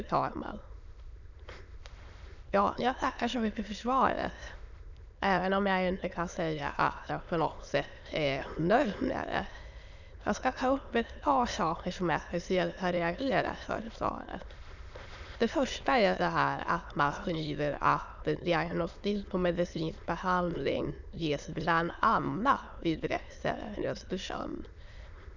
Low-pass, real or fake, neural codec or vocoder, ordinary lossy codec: 7.2 kHz; fake; autoencoder, 22.05 kHz, a latent of 192 numbers a frame, VITS, trained on many speakers; none